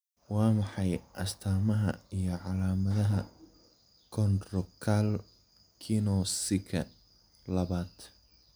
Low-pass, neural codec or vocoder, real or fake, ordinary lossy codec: none; none; real; none